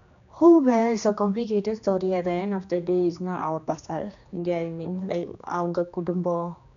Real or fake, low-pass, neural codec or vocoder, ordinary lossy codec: fake; 7.2 kHz; codec, 16 kHz, 2 kbps, X-Codec, HuBERT features, trained on general audio; none